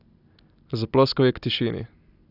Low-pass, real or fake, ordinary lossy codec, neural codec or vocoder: 5.4 kHz; real; none; none